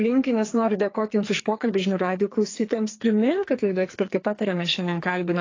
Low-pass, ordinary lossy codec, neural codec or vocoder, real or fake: 7.2 kHz; AAC, 32 kbps; codec, 44.1 kHz, 2.6 kbps, SNAC; fake